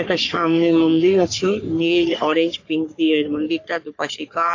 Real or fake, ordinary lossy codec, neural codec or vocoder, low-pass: fake; AAC, 48 kbps; codec, 44.1 kHz, 3.4 kbps, Pupu-Codec; 7.2 kHz